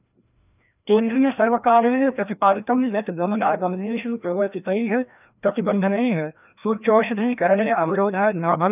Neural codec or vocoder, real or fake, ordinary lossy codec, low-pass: codec, 16 kHz, 1 kbps, FreqCodec, larger model; fake; none; 3.6 kHz